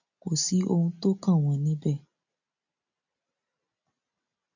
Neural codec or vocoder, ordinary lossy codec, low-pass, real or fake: none; none; 7.2 kHz; real